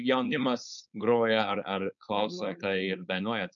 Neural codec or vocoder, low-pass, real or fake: codec, 16 kHz, 4.8 kbps, FACodec; 7.2 kHz; fake